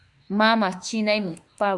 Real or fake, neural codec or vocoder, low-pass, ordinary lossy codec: fake; autoencoder, 48 kHz, 32 numbers a frame, DAC-VAE, trained on Japanese speech; 10.8 kHz; Opus, 64 kbps